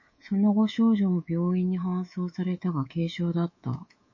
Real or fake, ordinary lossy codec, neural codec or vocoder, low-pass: fake; MP3, 32 kbps; codec, 24 kHz, 3.1 kbps, DualCodec; 7.2 kHz